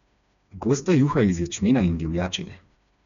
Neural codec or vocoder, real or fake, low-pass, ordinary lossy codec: codec, 16 kHz, 2 kbps, FreqCodec, smaller model; fake; 7.2 kHz; none